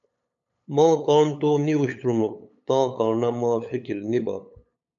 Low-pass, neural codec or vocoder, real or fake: 7.2 kHz; codec, 16 kHz, 8 kbps, FunCodec, trained on LibriTTS, 25 frames a second; fake